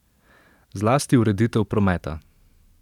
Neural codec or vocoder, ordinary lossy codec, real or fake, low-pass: vocoder, 44.1 kHz, 128 mel bands every 512 samples, BigVGAN v2; none; fake; 19.8 kHz